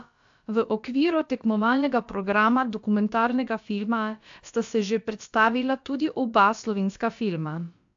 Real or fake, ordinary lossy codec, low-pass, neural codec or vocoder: fake; AAC, 64 kbps; 7.2 kHz; codec, 16 kHz, about 1 kbps, DyCAST, with the encoder's durations